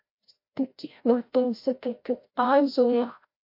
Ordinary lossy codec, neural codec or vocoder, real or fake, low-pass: MP3, 32 kbps; codec, 16 kHz, 0.5 kbps, FreqCodec, larger model; fake; 5.4 kHz